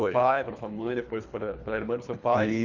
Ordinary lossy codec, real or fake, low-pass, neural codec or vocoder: none; fake; 7.2 kHz; codec, 24 kHz, 3 kbps, HILCodec